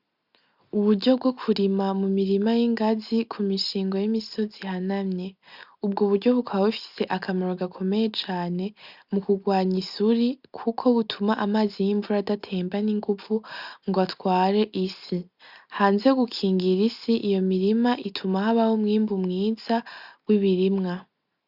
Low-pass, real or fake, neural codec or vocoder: 5.4 kHz; real; none